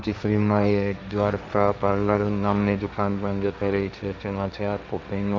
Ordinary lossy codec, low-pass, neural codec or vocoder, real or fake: none; none; codec, 16 kHz, 1.1 kbps, Voila-Tokenizer; fake